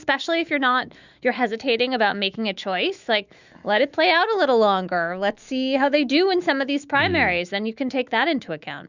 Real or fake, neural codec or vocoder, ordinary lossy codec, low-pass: fake; autoencoder, 48 kHz, 128 numbers a frame, DAC-VAE, trained on Japanese speech; Opus, 64 kbps; 7.2 kHz